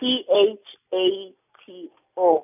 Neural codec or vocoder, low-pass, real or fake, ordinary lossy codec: none; 3.6 kHz; real; none